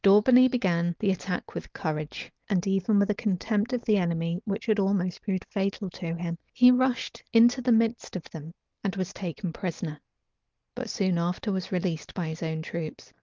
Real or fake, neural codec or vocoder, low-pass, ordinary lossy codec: real; none; 7.2 kHz; Opus, 32 kbps